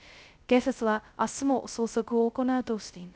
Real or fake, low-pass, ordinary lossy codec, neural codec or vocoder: fake; none; none; codec, 16 kHz, 0.2 kbps, FocalCodec